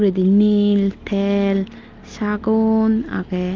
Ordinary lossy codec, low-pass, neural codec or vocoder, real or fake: Opus, 24 kbps; 7.2 kHz; none; real